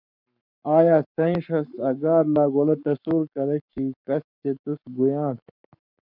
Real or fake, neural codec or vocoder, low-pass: fake; codec, 44.1 kHz, 7.8 kbps, Pupu-Codec; 5.4 kHz